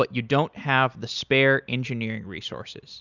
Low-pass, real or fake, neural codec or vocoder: 7.2 kHz; real; none